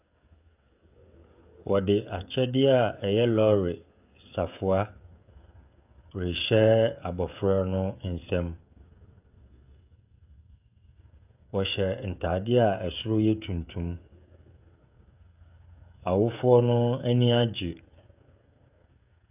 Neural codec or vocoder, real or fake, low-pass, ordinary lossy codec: codec, 16 kHz, 16 kbps, FreqCodec, smaller model; fake; 3.6 kHz; AAC, 32 kbps